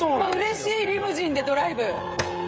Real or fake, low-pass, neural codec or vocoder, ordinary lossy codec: fake; none; codec, 16 kHz, 16 kbps, FreqCodec, smaller model; none